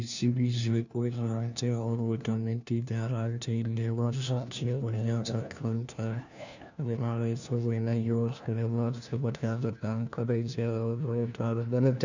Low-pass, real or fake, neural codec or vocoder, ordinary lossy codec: 7.2 kHz; fake; codec, 16 kHz, 1 kbps, FunCodec, trained on LibriTTS, 50 frames a second; none